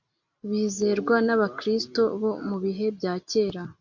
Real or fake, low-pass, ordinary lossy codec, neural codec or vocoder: real; 7.2 kHz; MP3, 48 kbps; none